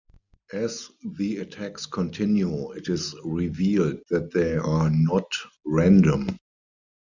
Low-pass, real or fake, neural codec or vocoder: 7.2 kHz; real; none